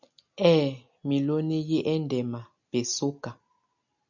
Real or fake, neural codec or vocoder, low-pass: real; none; 7.2 kHz